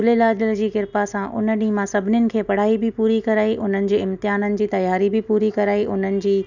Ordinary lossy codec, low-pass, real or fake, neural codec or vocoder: none; 7.2 kHz; real; none